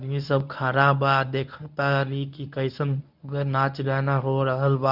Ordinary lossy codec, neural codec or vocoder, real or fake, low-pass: none; codec, 24 kHz, 0.9 kbps, WavTokenizer, medium speech release version 1; fake; 5.4 kHz